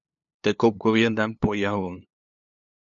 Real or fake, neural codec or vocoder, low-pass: fake; codec, 16 kHz, 2 kbps, FunCodec, trained on LibriTTS, 25 frames a second; 7.2 kHz